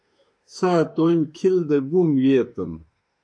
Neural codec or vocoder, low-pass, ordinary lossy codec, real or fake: autoencoder, 48 kHz, 32 numbers a frame, DAC-VAE, trained on Japanese speech; 9.9 kHz; MP3, 64 kbps; fake